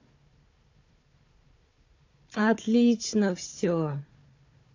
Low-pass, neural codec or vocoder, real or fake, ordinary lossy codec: 7.2 kHz; codec, 16 kHz, 4 kbps, FunCodec, trained on Chinese and English, 50 frames a second; fake; AAC, 48 kbps